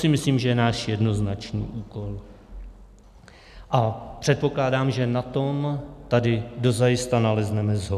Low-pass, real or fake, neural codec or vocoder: 14.4 kHz; real; none